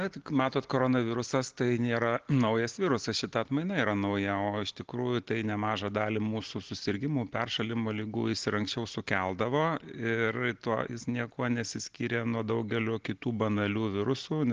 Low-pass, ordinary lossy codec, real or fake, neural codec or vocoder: 7.2 kHz; Opus, 16 kbps; real; none